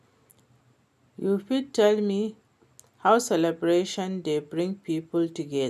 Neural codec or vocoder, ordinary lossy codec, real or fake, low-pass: none; none; real; 14.4 kHz